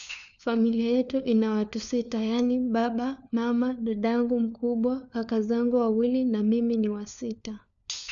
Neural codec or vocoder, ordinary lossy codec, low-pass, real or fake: codec, 16 kHz, 4 kbps, FunCodec, trained on LibriTTS, 50 frames a second; none; 7.2 kHz; fake